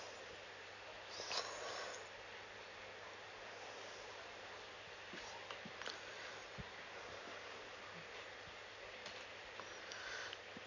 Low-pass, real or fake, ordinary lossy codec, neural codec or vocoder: 7.2 kHz; real; none; none